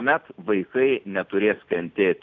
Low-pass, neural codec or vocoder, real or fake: 7.2 kHz; none; real